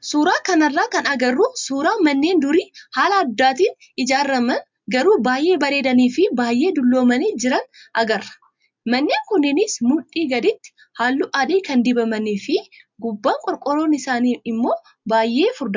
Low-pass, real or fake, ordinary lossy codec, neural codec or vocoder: 7.2 kHz; real; MP3, 64 kbps; none